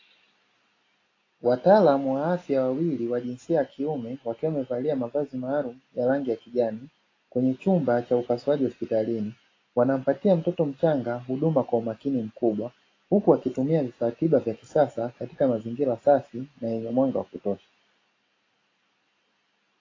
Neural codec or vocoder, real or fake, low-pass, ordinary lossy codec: none; real; 7.2 kHz; AAC, 32 kbps